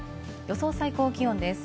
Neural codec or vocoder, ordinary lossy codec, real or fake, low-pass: none; none; real; none